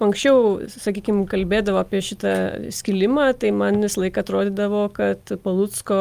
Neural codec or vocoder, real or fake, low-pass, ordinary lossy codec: none; real; 14.4 kHz; Opus, 32 kbps